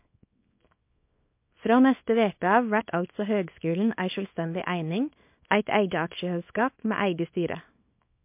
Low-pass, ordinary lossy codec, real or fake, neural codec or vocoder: 3.6 kHz; MP3, 32 kbps; fake; codec, 24 kHz, 0.9 kbps, WavTokenizer, small release